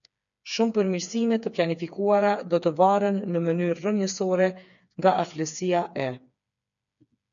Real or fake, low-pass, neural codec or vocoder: fake; 7.2 kHz; codec, 16 kHz, 4 kbps, FreqCodec, smaller model